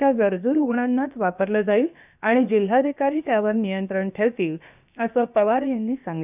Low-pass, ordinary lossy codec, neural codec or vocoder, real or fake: 3.6 kHz; none; codec, 16 kHz, about 1 kbps, DyCAST, with the encoder's durations; fake